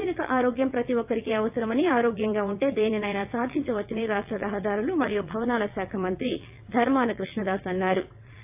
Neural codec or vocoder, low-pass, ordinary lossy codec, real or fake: vocoder, 22.05 kHz, 80 mel bands, WaveNeXt; 3.6 kHz; AAC, 32 kbps; fake